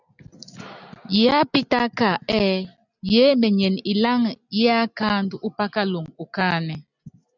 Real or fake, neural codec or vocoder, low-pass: real; none; 7.2 kHz